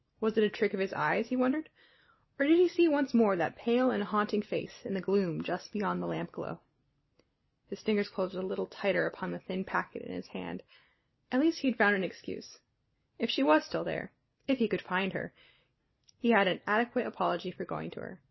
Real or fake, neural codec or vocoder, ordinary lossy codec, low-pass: real; none; MP3, 24 kbps; 7.2 kHz